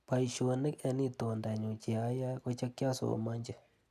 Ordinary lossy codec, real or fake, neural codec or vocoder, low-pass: none; real; none; 14.4 kHz